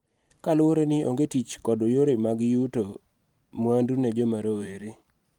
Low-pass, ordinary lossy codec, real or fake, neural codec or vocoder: 19.8 kHz; Opus, 32 kbps; fake; vocoder, 44.1 kHz, 128 mel bands every 512 samples, BigVGAN v2